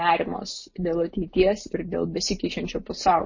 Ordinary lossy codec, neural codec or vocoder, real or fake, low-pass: MP3, 32 kbps; none; real; 7.2 kHz